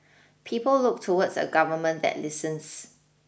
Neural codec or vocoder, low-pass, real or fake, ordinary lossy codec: none; none; real; none